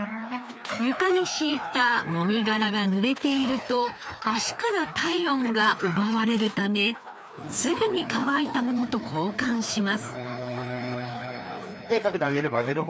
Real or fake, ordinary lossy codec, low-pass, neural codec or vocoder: fake; none; none; codec, 16 kHz, 2 kbps, FreqCodec, larger model